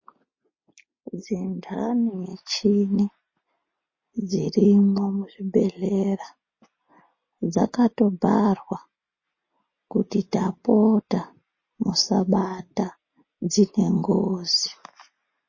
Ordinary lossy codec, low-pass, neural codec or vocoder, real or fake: MP3, 32 kbps; 7.2 kHz; none; real